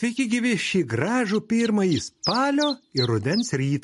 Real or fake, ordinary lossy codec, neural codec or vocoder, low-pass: real; MP3, 48 kbps; none; 14.4 kHz